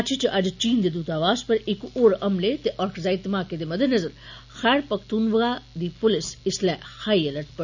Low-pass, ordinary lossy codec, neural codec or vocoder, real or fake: 7.2 kHz; none; none; real